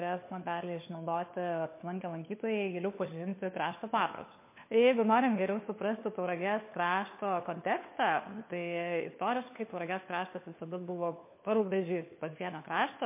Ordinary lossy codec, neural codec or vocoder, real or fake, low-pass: MP3, 24 kbps; codec, 16 kHz, 4 kbps, FunCodec, trained on LibriTTS, 50 frames a second; fake; 3.6 kHz